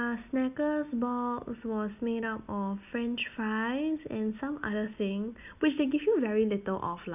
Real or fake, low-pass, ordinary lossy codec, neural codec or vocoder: real; 3.6 kHz; none; none